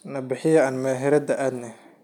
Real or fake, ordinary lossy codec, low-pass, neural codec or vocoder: real; none; 19.8 kHz; none